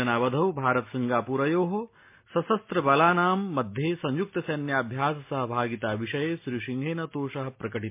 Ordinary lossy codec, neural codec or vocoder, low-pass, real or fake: MP3, 24 kbps; none; 3.6 kHz; real